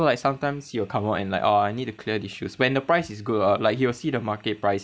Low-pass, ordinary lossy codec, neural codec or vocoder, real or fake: none; none; none; real